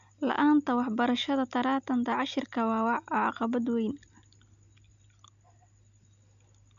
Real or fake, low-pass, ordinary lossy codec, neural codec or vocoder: real; 7.2 kHz; none; none